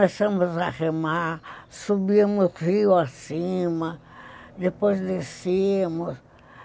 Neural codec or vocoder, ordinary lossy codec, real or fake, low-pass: none; none; real; none